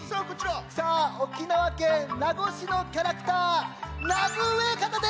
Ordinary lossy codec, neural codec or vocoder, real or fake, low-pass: none; none; real; none